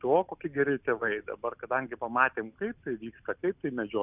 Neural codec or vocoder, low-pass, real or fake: none; 3.6 kHz; real